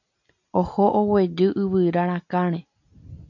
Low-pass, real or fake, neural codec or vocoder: 7.2 kHz; real; none